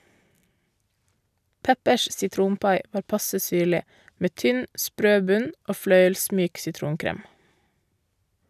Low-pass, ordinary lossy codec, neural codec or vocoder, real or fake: 14.4 kHz; none; none; real